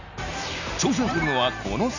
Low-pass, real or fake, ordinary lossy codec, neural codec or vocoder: 7.2 kHz; real; none; none